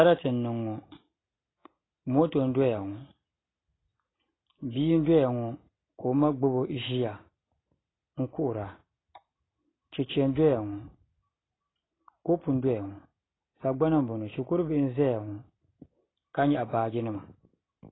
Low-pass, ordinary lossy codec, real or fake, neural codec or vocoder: 7.2 kHz; AAC, 16 kbps; real; none